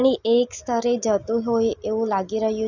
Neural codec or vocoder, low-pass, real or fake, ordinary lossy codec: none; 7.2 kHz; real; none